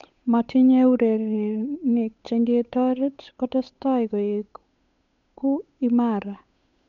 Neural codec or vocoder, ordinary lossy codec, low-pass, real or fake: codec, 16 kHz, 8 kbps, FunCodec, trained on Chinese and English, 25 frames a second; none; 7.2 kHz; fake